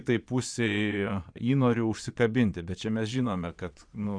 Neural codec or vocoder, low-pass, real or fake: vocoder, 22.05 kHz, 80 mel bands, Vocos; 9.9 kHz; fake